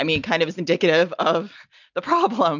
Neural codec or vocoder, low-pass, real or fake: none; 7.2 kHz; real